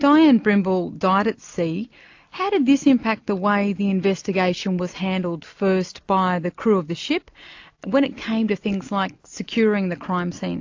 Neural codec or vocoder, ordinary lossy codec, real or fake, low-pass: none; AAC, 48 kbps; real; 7.2 kHz